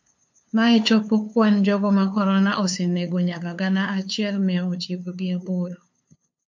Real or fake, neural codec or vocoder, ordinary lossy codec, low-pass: fake; codec, 16 kHz, 2 kbps, FunCodec, trained on LibriTTS, 25 frames a second; MP3, 48 kbps; 7.2 kHz